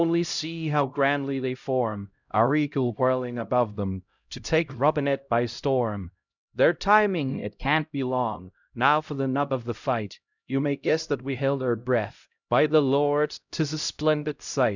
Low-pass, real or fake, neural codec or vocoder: 7.2 kHz; fake; codec, 16 kHz, 0.5 kbps, X-Codec, HuBERT features, trained on LibriSpeech